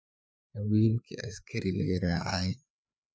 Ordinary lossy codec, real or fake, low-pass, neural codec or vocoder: none; fake; none; codec, 16 kHz, 4 kbps, FreqCodec, larger model